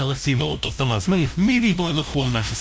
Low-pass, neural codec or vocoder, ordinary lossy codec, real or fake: none; codec, 16 kHz, 0.5 kbps, FunCodec, trained on LibriTTS, 25 frames a second; none; fake